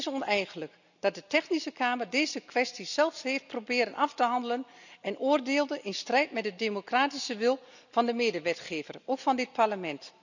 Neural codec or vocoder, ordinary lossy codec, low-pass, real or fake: none; none; 7.2 kHz; real